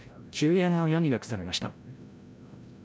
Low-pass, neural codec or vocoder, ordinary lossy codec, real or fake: none; codec, 16 kHz, 0.5 kbps, FreqCodec, larger model; none; fake